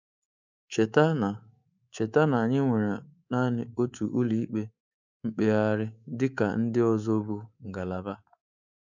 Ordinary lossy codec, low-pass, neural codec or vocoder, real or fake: none; 7.2 kHz; codec, 24 kHz, 3.1 kbps, DualCodec; fake